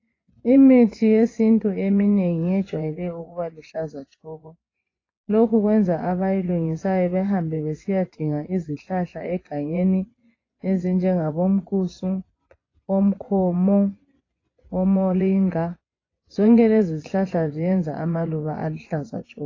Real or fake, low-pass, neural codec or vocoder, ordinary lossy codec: fake; 7.2 kHz; vocoder, 24 kHz, 100 mel bands, Vocos; AAC, 32 kbps